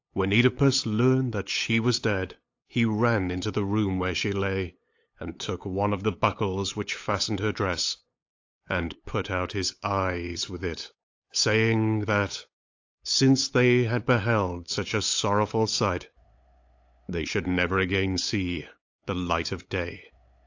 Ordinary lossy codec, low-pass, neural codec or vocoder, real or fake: AAC, 48 kbps; 7.2 kHz; codec, 16 kHz, 8 kbps, FunCodec, trained on LibriTTS, 25 frames a second; fake